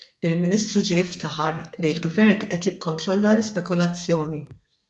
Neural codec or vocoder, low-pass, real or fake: codec, 32 kHz, 1.9 kbps, SNAC; 10.8 kHz; fake